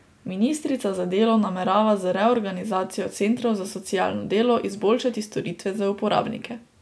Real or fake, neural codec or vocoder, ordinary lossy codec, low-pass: real; none; none; none